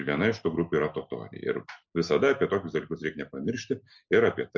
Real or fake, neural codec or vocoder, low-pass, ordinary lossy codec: real; none; 7.2 kHz; MP3, 64 kbps